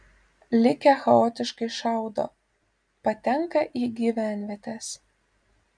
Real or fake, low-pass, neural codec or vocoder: real; 9.9 kHz; none